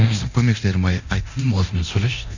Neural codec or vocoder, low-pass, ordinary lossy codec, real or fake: codec, 24 kHz, 0.9 kbps, DualCodec; 7.2 kHz; none; fake